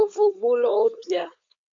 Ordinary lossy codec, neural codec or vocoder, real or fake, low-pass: MP3, 48 kbps; codec, 16 kHz, 4.8 kbps, FACodec; fake; 7.2 kHz